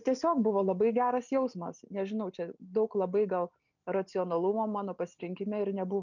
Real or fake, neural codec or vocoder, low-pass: real; none; 7.2 kHz